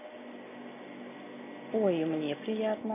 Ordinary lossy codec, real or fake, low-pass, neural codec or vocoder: none; real; 3.6 kHz; none